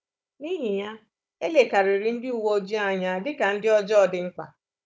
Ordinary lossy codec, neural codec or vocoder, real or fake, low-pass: none; codec, 16 kHz, 16 kbps, FunCodec, trained on Chinese and English, 50 frames a second; fake; none